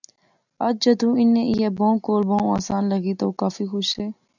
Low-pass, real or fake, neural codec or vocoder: 7.2 kHz; real; none